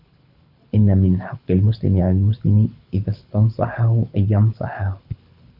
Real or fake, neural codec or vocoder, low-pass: fake; codec, 24 kHz, 6 kbps, HILCodec; 5.4 kHz